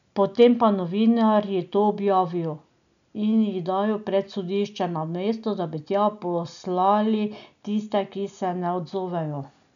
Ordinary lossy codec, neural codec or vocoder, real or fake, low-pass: none; none; real; 7.2 kHz